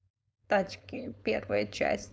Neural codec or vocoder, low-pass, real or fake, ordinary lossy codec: codec, 16 kHz, 4.8 kbps, FACodec; none; fake; none